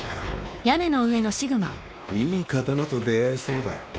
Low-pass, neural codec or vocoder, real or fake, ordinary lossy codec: none; codec, 16 kHz, 2 kbps, X-Codec, WavLM features, trained on Multilingual LibriSpeech; fake; none